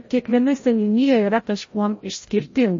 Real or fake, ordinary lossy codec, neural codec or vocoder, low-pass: fake; MP3, 32 kbps; codec, 16 kHz, 0.5 kbps, FreqCodec, larger model; 7.2 kHz